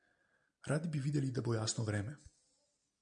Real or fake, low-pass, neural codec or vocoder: real; 9.9 kHz; none